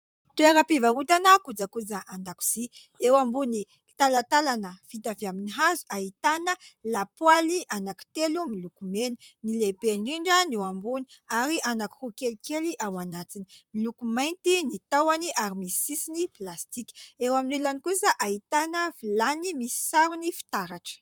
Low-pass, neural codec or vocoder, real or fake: 19.8 kHz; vocoder, 44.1 kHz, 128 mel bands, Pupu-Vocoder; fake